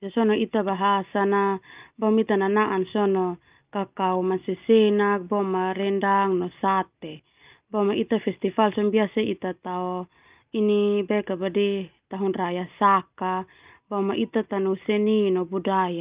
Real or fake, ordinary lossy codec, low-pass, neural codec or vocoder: real; Opus, 24 kbps; 3.6 kHz; none